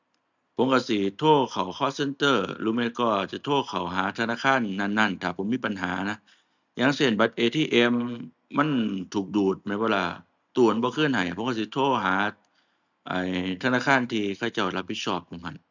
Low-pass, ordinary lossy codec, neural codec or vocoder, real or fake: 7.2 kHz; none; none; real